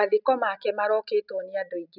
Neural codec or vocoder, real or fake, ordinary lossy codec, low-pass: none; real; none; 5.4 kHz